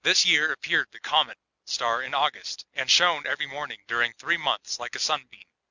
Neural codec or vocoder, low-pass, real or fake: none; 7.2 kHz; real